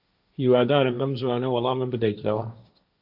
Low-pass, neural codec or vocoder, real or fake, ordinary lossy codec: 5.4 kHz; codec, 16 kHz, 1.1 kbps, Voila-Tokenizer; fake; AAC, 48 kbps